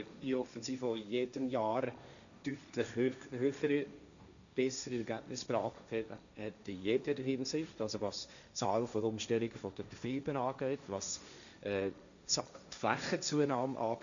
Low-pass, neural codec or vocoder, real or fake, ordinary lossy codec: 7.2 kHz; codec, 16 kHz, 1.1 kbps, Voila-Tokenizer; fake; none